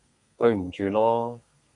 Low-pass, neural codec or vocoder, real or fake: 10.8 kHz; codec, 32 kHz, 1.9 kbps, SNAC; fake